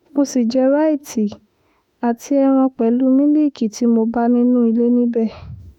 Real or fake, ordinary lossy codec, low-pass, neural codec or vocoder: fake; none; 19.8 kHz; autoencoder, 48 kHz, 32 numbers a frame, DAC-VAE, trained on Japanese speech